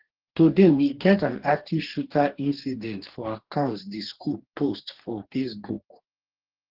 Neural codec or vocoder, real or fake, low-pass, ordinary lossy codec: codec, 44.1 kHz, 2.6 kbps, DAC; fake; 5.4 kHz; Opus, 16 kbps